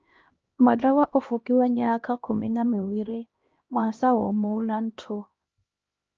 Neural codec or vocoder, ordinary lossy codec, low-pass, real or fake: codec, 16 kHz, 1 kbps, X-Codec, HuBERT features, trained on LibriSpeech; Opus, 24 kbps; 7.2 kHz; fake